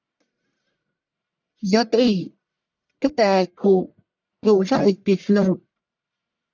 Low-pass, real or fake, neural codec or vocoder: 7.2 kHz; fake; codec, 44.1 kHz, 1.7 kbps, Pupu-Codec